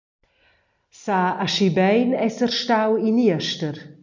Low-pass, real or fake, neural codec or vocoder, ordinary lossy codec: 7.2 kHz; real; none; MP3, 64 kbps